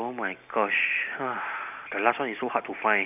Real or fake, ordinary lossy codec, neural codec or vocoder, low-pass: real; none; none; 3.6 kHz